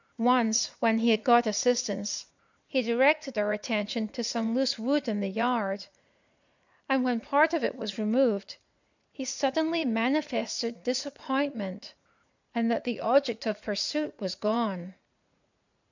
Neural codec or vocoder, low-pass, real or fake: vocoder, 44.1 kHz, 80 mel bands, Vocos; 7.2 kHz; fake